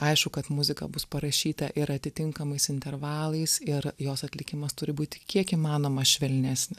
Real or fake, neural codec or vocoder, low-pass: real; none; 14.4 kHz